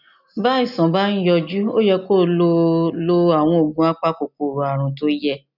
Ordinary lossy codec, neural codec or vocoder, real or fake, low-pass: none; none; real; 5.4 kHz